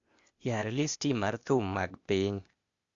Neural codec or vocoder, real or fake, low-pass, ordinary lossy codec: codec, 16 kHz, 0.8 kbps, ZipCodec; fake; 7.2 kHz; Opus, 64 kbps